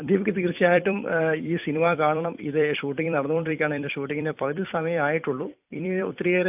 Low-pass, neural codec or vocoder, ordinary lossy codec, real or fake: 3.6 kHz; vocoder, 44.1 kHz, 128 mel bands every 256 samples, BigVGAN v2; AAC, 32 kbps; fake